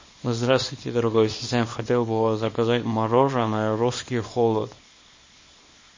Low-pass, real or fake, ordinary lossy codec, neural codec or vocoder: 7.2 kHz; fake; MP3, 32 kbps; codec, 24 kHz, 0.9 kbps, WavTokenizer, small release